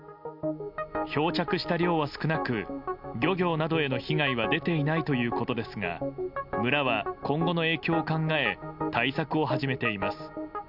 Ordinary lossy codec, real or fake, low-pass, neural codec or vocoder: none; real; 5.4 kHz; none